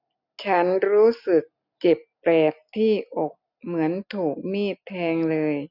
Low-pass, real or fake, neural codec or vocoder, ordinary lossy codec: 5.4 kHz; real; none; none